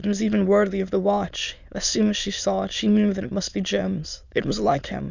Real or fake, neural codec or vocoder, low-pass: fake; autoencoder, 22.05 kHz, a latent of 192 numbers a frame, VITS, trained on many speakers; 7.2 kHz